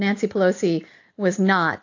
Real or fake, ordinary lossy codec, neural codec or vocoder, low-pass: real; AAC, 48 kbps; none; 7.2 kHz